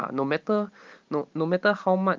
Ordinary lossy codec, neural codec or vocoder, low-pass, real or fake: Opus, 32 kbps; none; 7.2 kHz; real